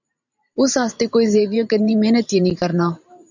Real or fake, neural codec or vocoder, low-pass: fake; vocoder, 44.1 kHz, 128 mel bands every 512 samples, BigVGAN v2; 7.2 kHz